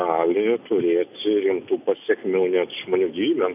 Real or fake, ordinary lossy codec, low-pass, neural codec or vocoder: real; AAC, 32 kbps; 3.6 kHz; none